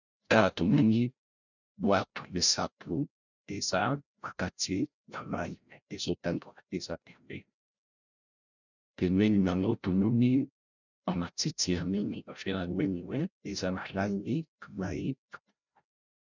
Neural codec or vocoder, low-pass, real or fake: codec, 16 kHz, 0.5 kbps, FreqCodec, larger model; 7.2 kHz; fake